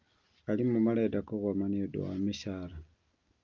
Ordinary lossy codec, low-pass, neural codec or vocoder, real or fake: Opus, 24 kbps; 7.2 kHz; none; real